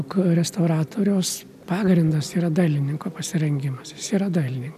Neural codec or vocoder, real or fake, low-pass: none; real; 14.4 kHz